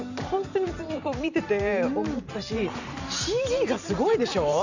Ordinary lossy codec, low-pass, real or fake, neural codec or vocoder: none; 7.2 kHz; fake; vocoder, 44.1 kHz, 128 mel bands every 512 samples, BigVGAN v2